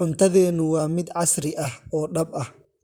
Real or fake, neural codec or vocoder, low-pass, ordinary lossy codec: fake; vocoder, 44.1 kHz, 128 mel bands, Pupu-Vocoder; none; none